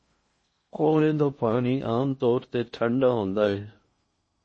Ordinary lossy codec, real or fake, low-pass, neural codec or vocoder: MP3, 32 kbps; fake; 9.9 kHz; codec, 16 kHz in and 24 kHz out, 0.6 kbps, FocalCodec, streaming, 4096 codes